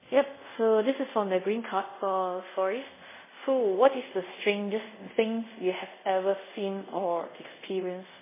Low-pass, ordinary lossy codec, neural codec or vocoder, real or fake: 3.6 kHz; MP3, 16 kbps; codec, 24 kHz, 0.5 kbps, DualCodec; fake